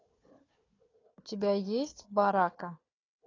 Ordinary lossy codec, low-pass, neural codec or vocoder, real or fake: AAC, 32 kbps; 7.2 kHz; codec, 16 kHz, 4 kbps, FunCodec, trained on Chinese and English, 50 frames a second; fake